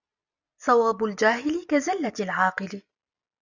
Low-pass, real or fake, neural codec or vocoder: 7.2 kHz; real; none